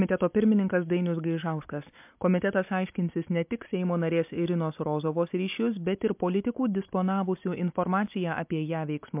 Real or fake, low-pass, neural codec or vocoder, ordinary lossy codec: real; 3.6 kHz; none; MP3, 32 kbps